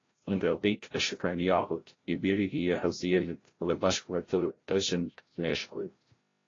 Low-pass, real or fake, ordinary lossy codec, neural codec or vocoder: 7.2 kHz; fake; AAC, 32 kbps; codec, 16 kHz, 0.5 kbps, FreqCodec, larger model